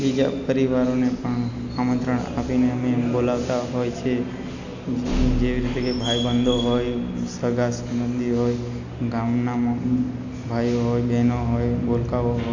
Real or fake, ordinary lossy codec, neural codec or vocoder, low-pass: real; AAC, 48 kbps; none; 7.2 kHz